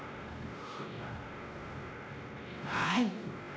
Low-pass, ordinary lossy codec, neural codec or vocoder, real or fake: none; none; codec, 16 kHz, 0.5 kbps, X-Codec, WavLM features, trained on Multilingual LibriSpeech; fake